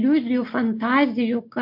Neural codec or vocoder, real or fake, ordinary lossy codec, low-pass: none; real; MP3, 32 kbps; 5.4 kHz